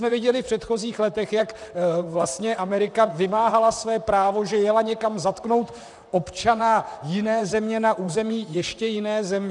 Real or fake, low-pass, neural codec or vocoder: fake; 10.8 kHz; vocoder, 44.1 kHz, 128 mel bands, Pupu-Vocoder